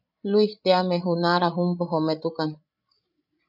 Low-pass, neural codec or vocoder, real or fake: 5.4 kHz; codec, 16 kHz, 16 kbps, FreqCodec, larger model; fake